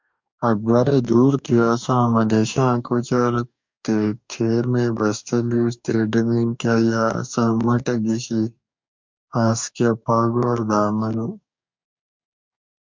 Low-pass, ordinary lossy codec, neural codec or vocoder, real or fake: 7.2 kHz; MP3, 64 kbps; codec, 44.1 kHz, 2.6 kbps, DAC; fake